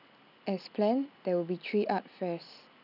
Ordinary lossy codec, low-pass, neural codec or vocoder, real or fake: none; 5.4 kHz; none; real